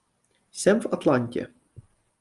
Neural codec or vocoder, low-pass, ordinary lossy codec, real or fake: none; 10.8 kHz; Opus, 32 kbps; real